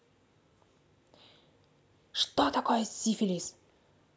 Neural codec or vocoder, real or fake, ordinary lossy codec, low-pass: none; real; none; none